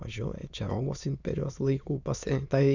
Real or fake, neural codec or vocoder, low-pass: fake; autoencoder, 22.05 kHz, a latent of 192 numbers a frame, VITS, trained on many speakers; 7.2 kHz